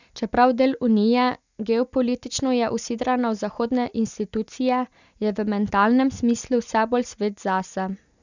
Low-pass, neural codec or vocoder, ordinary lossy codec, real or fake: 7.2 kHz; none; none; real